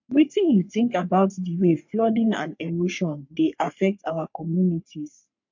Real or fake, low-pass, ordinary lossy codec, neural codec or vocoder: fake; 7.2 kHz; MP3, 48 kbps; codec, 44.1 kHz, 3.4 kbps, Pupu-Codec